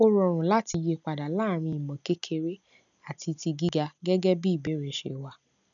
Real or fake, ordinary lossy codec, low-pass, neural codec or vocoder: real; none; 7.2 kHz; none